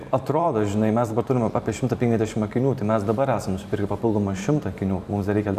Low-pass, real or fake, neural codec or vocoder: 14.4 kHz; fake; vocoder, 44.1 kHz, 128 mel bands every 512 samples, BigVGAN v2